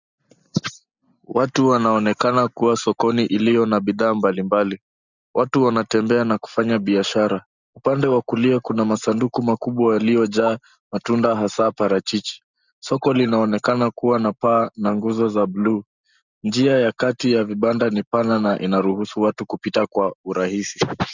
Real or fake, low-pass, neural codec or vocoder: real; 7.2 kHz; none